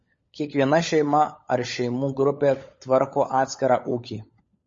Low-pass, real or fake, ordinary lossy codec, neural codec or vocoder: 7.2 kHz; fake; MP3, 32 kbps; codec, 16 kHz, 16 kbps, FunCodec, trained on LibriTTS, 50 frames a second